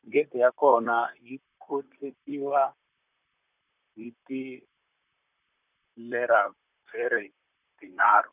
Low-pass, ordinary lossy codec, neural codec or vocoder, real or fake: 3.6 kHz; none; vocoder, 44.1 kHz, 128 mel bands, Pupu-Vocoder; fake